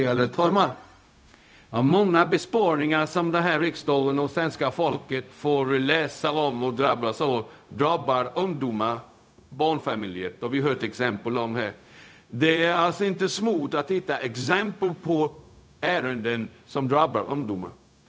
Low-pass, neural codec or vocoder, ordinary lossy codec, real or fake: none; codec, 16 kHz, 0.4 kbps, LongCat-Audio-Codec; none; fake